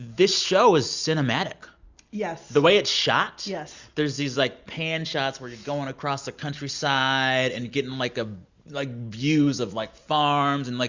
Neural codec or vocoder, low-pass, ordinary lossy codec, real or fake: none; 7.2 kHz; Opus, 64 kbps; real